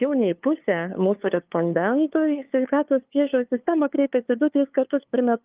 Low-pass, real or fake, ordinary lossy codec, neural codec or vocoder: 3.6 kHz; fake; Opus, 32 kbps; codec, 16 kHz, 2 kbps, FunCodec, trained on LibriTTS, 25 frames a second